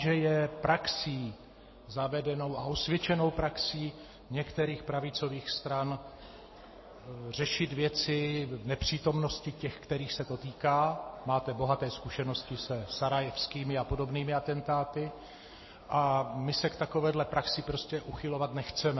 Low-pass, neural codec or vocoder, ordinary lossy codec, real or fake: 7.2 kHz; none; MP3, 24 kbps; real